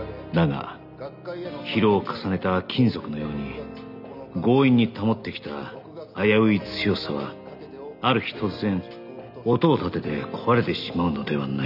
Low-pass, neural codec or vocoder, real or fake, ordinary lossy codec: 5.4 kHz; none; real; none